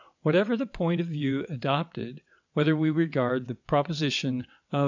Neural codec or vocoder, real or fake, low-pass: vocoder, 22.05 kHz, 80 mel bands, WaveNeXt; fake; 7.2 kHz